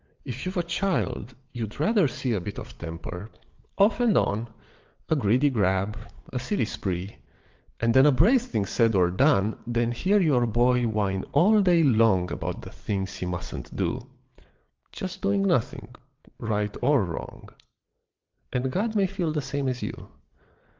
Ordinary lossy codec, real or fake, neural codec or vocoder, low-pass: Opus, 32 kbps; fake; vocoder, 22.05 kHz, 80 mel bands, WaveNeXt; 7.2 kHz